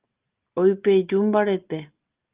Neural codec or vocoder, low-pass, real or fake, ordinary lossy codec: none; 3.6 kHz; real; Opus, 32 kbps